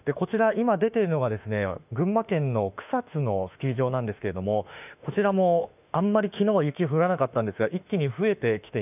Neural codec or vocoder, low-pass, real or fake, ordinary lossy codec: autoencoder, 48 kHz, 32 numbers a frame, DAC-VAE, trained on Japanese speech; 3.6 kHz; fake; none